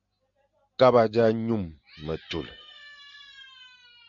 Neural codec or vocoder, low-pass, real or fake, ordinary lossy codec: none; 7.2 kHz; real; MP3, 64 kbps